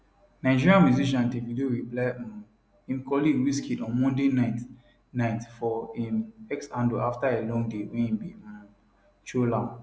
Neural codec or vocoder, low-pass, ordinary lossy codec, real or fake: none; none; none; real